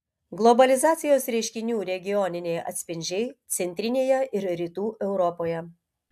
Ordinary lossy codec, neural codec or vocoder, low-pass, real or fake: AAC, 96 kbps; none; 14.4 kHz; real